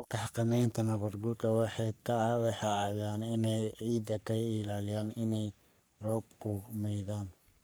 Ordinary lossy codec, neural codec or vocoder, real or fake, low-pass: none; codec, 44.1 kHz, 3.4 kbps, Pupu-Codec; fake; none